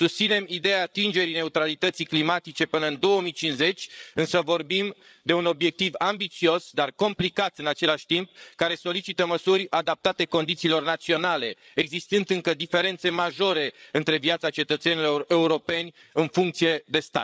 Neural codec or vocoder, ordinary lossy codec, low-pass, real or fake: codec, 16 kHz, 8 kbps, FreqCodec, larger model; none; none; fake